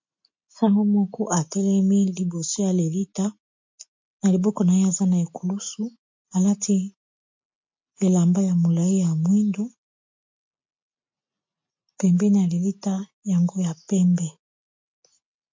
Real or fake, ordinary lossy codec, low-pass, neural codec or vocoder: real; MP3, 48 kbps; 7.2 kHz; none